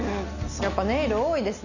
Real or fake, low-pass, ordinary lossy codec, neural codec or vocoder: real; 7.2 kHz; none; none